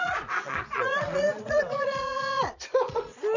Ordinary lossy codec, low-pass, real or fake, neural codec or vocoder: none; 7.2 kHz; fake; vocoder, 22.05 kHz, 80 mel bands, Vocos